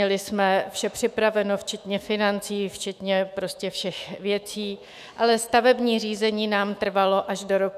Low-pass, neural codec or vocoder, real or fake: 14.4 kHz; autoencoder, 48 kHz, 128 numbers a frame, DAC-VAE, trained on Japanese speech; fake